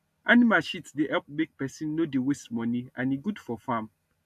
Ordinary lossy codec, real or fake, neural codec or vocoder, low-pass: AAC, 96 kbps; real; none; 14.4 kHz